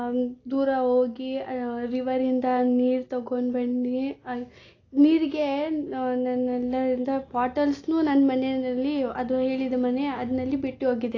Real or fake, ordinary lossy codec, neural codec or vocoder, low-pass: real; AAC, 32 kbps; none; 7.2 kHz